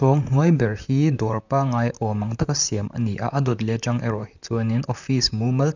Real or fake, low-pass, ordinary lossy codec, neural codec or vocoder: fake; 7.2 kHz; none; vocoder, 44.1 kHz, 128 mel bands, Pupu-Vocoder